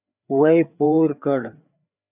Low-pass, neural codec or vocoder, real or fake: 3.6 kHz; codec, 16 kHz, 4 kbps, FreqCodec, larger model; fake